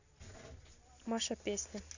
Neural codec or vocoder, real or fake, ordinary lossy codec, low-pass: none; real; none; 7.2 kHz